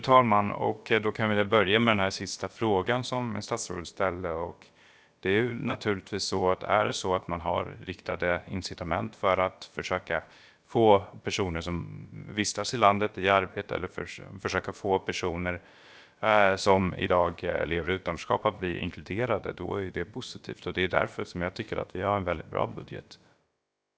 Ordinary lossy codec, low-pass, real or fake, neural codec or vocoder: none; none; fake; codec, 16 kHz, about 1 kbps, DyCAST, with the encoder's durations